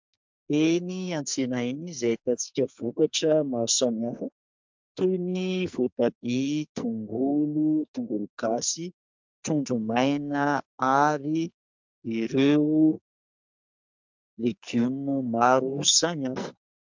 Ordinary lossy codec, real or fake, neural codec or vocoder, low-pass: MP3, 64 kbps; fake; codec, 44.1 kHz, 2.6 kbps, SNAC; 7.2 kHz